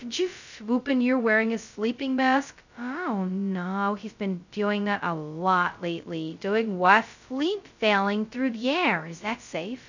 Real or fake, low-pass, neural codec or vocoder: fake; 7.2 kHz; codec, 16 kHz, 0.2 kbps, FocalCodec